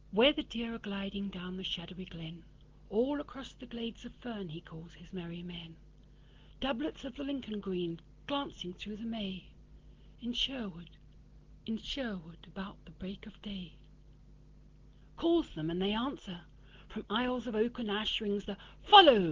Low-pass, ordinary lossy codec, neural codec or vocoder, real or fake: 7.2 kHz; Opus, 16 kbps; none; real